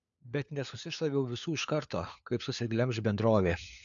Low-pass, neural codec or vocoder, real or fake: 7.2 kHz; codec, 16 kHz, 4 kbps, FunCodec, trained on LibriTTS, 50 frames a second; fake